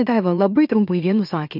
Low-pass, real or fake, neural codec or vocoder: 5.4 kHz; fake; autoencoder, 44.1 kHz, a latent of 192 numbers a frame, MeloTTS